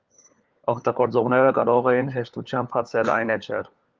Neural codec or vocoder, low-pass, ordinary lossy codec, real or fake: codec, 16 kHz, 4 kbps, FunCodec, trained on LibriTTS, 50 frames a second; 7.2 kHz; Opus, 32 kbps; fake